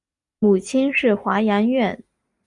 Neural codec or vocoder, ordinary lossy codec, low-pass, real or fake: none; Opus, 64 kbps; 10.8 kHz; real